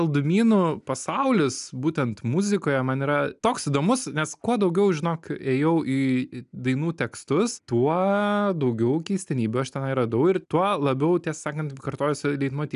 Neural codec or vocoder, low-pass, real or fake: none; 10.8 kHz; real